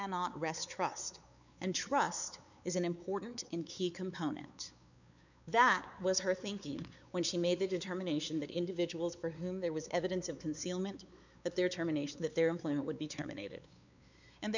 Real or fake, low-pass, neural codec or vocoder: fake; 7.2 kHz; codec, 16 kHz, 4 kbps, X-Codec, WavLM features, trained on Multilingual LibriSpeech